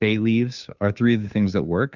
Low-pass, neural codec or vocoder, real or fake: 7.2 kHz; codec, 16 kHz, 2 kbps, FunCodec, trained on Chinese and English, 25 frames a second; fake